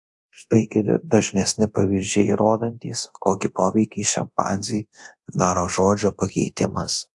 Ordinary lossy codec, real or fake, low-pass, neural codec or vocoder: AAC, 64 kbps; fake; 10.8 kHz; codec, 24 kHz, 0.9 kbps, DualCodec